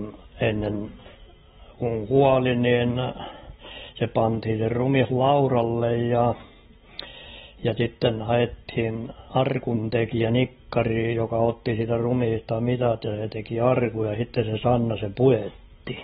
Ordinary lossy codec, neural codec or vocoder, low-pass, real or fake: AAC, 16 kbps; none; 19.8 kHz; real